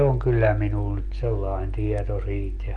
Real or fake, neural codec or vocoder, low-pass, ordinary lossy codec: real; none; 9.9 kHz; Opus, 32 kbps